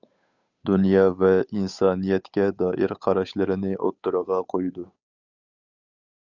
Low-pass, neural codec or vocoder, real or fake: 7.2 kHz; codec, 16 kHz, 8 kbps, FunCodec, trained on Chinese and English, 25 frames a second; fake